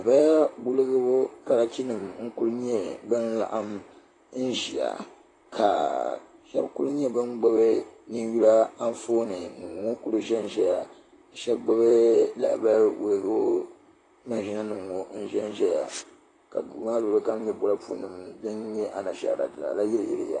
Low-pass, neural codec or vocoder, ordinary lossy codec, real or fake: 10.8 kHz; vocoder, 44.1 kHz, 128 mel bands, Pupu-Vocoder; AAC, 32 kbps; fake